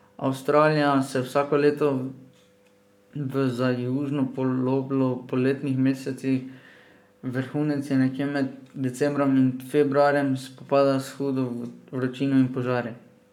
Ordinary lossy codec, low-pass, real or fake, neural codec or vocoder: none; 19.8 kHz; fake; codec, 44.1 kHz, 7.8 kbps, Pupu-Codec